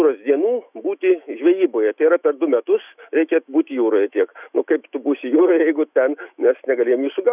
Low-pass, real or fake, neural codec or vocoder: 3.6 kHz; fake; autoencoder, 48 kHz, 128 numbers a frame, DAC-VAE, trained on Japanese speech